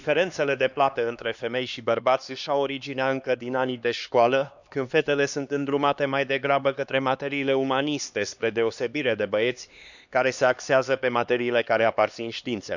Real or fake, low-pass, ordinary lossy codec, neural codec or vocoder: fake; 7.2 kHz; none; codec, 16 kHz, 2 kbps, X-Codec, HuBERT features, trained on LibriSpeech